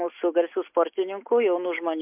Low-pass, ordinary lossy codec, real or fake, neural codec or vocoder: 3.6 kHz; MP3, 32 kbps; real; none